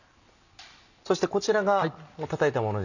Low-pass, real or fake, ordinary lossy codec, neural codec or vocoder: 7.2 kHz; real; none; none